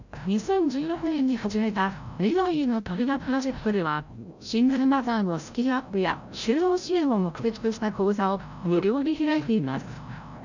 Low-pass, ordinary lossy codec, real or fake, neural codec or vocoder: 7.2 kHz; none; fake; codec, 16 kHz, 0.5 kbps, FreqCodec, larger model